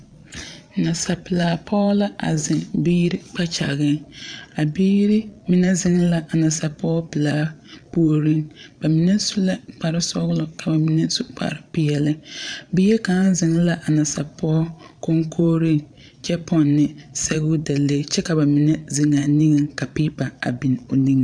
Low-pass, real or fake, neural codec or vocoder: 9.9 kHz; fake; vocoder, 22.05 kHz, 80 mel bands, Vocos